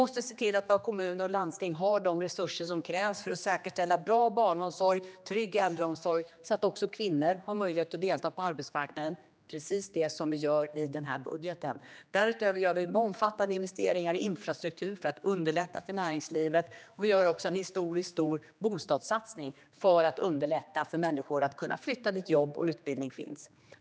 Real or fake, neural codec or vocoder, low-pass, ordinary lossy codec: fake; codec, 16 kHz, 2 kbps, X-Codec, HuBERT features, trained on general audio; none; none